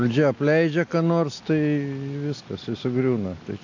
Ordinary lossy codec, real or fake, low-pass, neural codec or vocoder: AAC, 48 kbps; real; 7.2 kHz; none